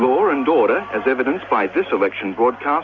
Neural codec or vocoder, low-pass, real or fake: none; 7.2 kHz; real